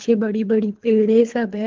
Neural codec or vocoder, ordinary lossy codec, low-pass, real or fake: codec, 24 kHz, 3 kbps, HILCodec; Opus, 16 kbps; 7.2 kHz; fake